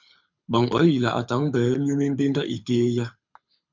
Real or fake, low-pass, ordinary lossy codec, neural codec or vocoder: fake; 7.2 kHz; MP3, 64 kbps; codec, 24 kHz, 6 kbps, HILCodec